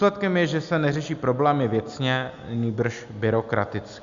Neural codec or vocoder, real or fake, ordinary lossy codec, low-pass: none; real; Opus, 64 kbps; 7.2 kHz